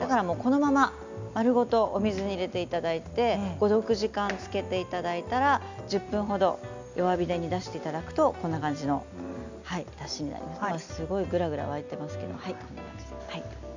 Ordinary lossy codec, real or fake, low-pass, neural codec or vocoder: none; real; 7.2 kHz; none